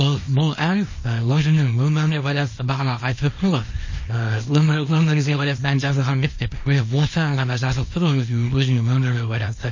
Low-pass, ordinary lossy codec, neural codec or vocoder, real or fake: 7.2 kHz; MP3, 32 kbps; codec, 24 kHz, 0.9 kbps, WavTokenizer, small release; fake